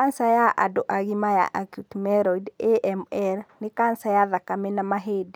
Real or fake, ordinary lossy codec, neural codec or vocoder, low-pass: real; none; none; none